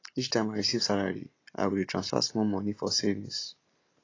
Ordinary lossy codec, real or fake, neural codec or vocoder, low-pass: AAC, 32 kbps; real; none; 7.2 kHz